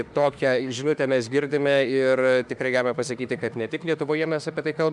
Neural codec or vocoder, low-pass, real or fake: autoencoder, 48 kHz, 32 numbers a frame, DAC-VAE, trained on Japanese speech; 10.8 kHz; fake